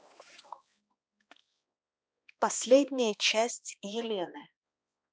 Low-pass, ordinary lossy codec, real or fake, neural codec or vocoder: none; none; fake; codec, 16 kHz, 2 kbps, X-Codec, HuBERT features, trained on balanced general audio